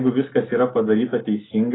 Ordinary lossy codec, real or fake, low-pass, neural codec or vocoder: AAC, 16 kbps; real; 7.2 kHz; none